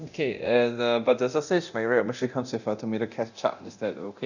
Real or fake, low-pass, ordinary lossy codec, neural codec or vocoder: fake; 7.2 kHz; none; codec, 24 kHz, 0.9 kbps, DualCodec